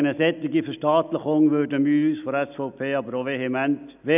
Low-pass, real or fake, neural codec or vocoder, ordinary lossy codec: 3.6 kHz; real; none; none